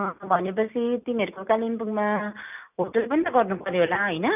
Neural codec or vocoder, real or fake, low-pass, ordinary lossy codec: none; real; 3.6 kHz; none